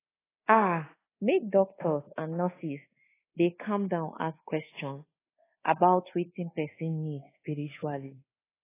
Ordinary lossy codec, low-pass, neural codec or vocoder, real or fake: AAC, 16 kbps; 3.6 kHz; codec, 24 kHz, 1.2 kbps, DualCodec; fake